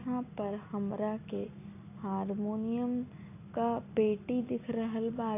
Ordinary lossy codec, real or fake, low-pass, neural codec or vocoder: AAC, 24 kbps; real; 3.6 kHz; none